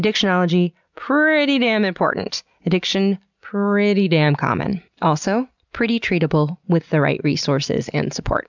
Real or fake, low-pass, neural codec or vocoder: real; 7.2 kHz; none